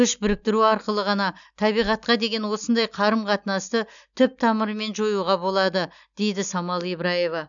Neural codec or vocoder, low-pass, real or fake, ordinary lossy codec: none; 7.2 kHz; real; none